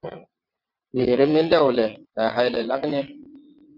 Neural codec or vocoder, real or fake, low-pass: vocoder, 22.05 kHz, 80 mel bands, WaveNeXt; fake; 5.4 kHz